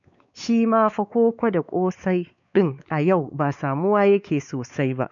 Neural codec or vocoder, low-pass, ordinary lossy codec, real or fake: codec, 16 kHz, 4 kbps, X-Codec, WavLM features, trained on Multilingual LibriSpeech; 7.2 kHz; none; fake